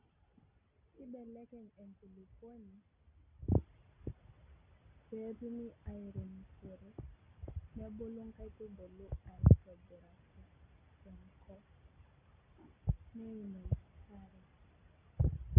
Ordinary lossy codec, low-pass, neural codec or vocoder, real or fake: none; 3.6 kHz; none; real